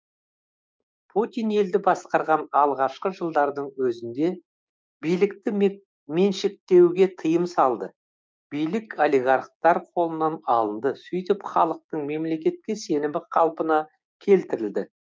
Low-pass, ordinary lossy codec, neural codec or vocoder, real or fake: none; none; codec, 16 kHz, 6 kbps, DAC; fake